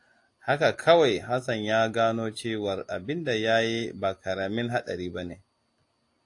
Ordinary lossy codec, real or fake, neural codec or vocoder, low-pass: AAC, 48 kbps; real; none; 10.8 kHz